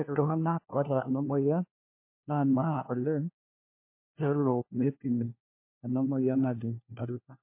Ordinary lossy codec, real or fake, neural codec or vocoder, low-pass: MP3, 24 kbps; fake; codec, 16 kHz, 1 kbps, FunCodec, trained on LibriTTS, 50 frames a second; 3.6 kHz